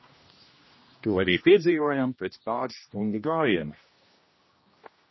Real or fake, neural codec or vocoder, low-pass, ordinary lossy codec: fake; codec, 16 kHz, 1 kbps, X-Codec, HuBERT features, trained on general audio; 7.2 kHz; MP3, 24 kbps